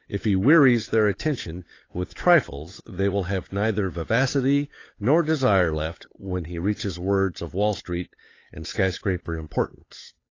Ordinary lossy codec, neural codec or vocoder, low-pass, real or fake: AAC, 32 kbps; codec, 16 kHz, 8 kbps, FunCodec, trained on Chinese and English, 25 frames a second; 7.2 kHz; fake